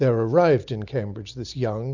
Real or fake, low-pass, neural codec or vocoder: real; 7.2 kHz; none